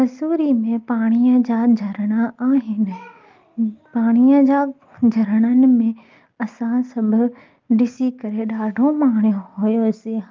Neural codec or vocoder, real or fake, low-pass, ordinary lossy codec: none; real; 7.2 kHz; Opus, 24 kbps